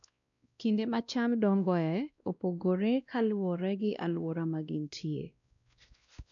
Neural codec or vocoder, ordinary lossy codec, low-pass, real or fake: codec, 16 kHz, 1 kbps, X-Codec, WavLM features, trained on Multilingual LibriSpeech; none; 7.2 kHz; fake